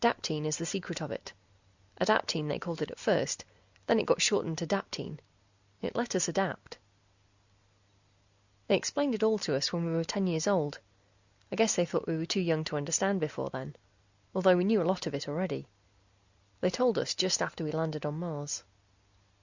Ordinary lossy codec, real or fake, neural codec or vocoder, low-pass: Opus, 64 kbps; real; none; 7.2 kHz